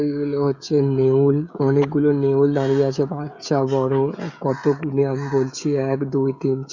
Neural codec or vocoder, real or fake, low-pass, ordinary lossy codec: codec, 16 kHz, 16 kbps, FreqCodec, smaller model; fake; 7.2 kHz; none